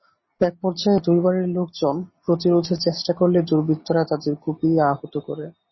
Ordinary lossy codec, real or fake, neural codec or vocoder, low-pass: MP3, 24 kbps; real; none; 7.2 kHz